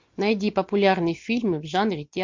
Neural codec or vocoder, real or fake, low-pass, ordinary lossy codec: none; real; 7.2 kHz; MP3, 48 kbps